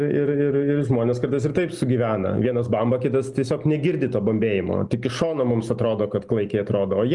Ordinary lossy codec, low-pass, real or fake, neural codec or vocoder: Opus, 32 kbps; 10.8 kHz; real; none